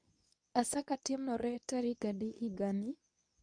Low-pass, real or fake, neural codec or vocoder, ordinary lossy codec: 9.9 kHz; fake; codec, 24 kHz, 0.9 kbps, WavTokenizer, medium speech release version 2; none